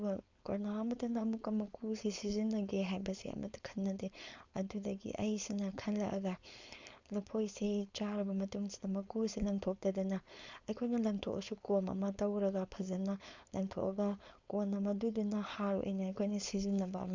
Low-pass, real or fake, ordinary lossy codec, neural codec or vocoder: 7.2 kHz; fake; Opus, 64 kbps; codec, 16 kHz, 4.8 kbps, FACodec